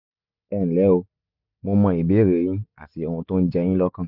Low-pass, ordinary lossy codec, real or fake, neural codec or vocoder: 5.4 kHz; none; real; none